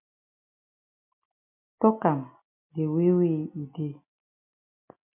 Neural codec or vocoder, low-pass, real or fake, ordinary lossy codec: none; 3.6 kHz; real; MP3, 32 kbps